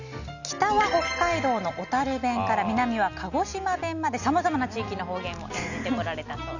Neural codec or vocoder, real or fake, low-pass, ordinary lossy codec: none; real; 7.2 kHz; none